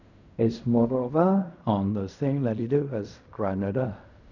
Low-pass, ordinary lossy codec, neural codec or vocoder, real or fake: 7.2 kHz; none; codec, 16 kHz in and 24 kHz out, 0.4 kbps, LongCat-Audio-Codec, fine tuned four codebook decoder; fake